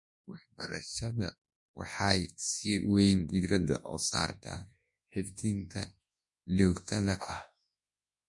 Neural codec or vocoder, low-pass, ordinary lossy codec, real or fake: codec, 24 kHz, 0.9 kbps, WavTokenizer, large speech release; 10.8 kHz; MP3, 48 kbps; fake